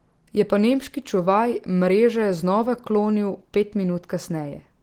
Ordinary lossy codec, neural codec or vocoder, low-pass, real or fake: Opus, 16 kbps; none; 19.8 kHz; real